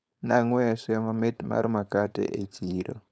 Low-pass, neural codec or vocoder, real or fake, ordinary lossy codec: none; codec, 16 kHz, 4.8 kbps, FACodec; fake; none